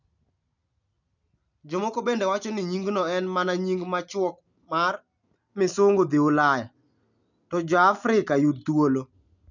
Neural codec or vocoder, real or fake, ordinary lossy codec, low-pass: none; real; none; 7.2 kHz